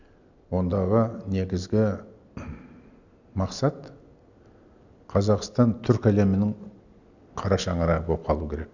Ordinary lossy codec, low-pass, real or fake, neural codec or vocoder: none; 7.2 kHz; real; none